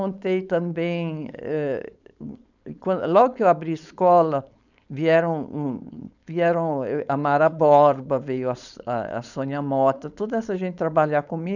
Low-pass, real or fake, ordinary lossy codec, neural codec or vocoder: 7.2 kHz; fake; none; codec, 16 kHz, 4.8 kbps, FACodec